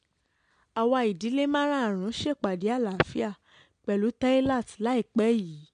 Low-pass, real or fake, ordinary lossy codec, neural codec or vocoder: 9.9 kHz; real; MP3, 64 kbps; none